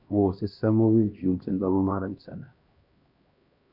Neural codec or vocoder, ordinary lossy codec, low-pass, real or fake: codec, 16 kHz, 1 kbps, X-Codec, HuBERT features, trained on LibriSpeech; Opus, 64 kbps; 5.4 kHz; fake